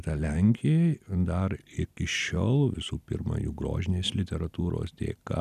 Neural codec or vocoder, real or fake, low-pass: none; real; 14.4 kHz